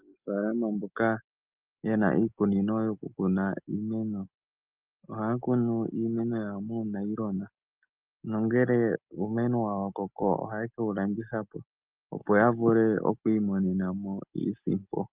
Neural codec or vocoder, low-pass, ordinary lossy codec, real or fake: none; 3.6 kHz; Opus, 24 kbps; real